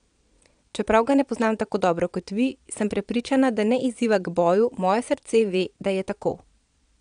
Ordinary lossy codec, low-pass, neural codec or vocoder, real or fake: none; 9.9 kHz; vocoder, 22.05 kHz, 80 mel bands, Vocos; fake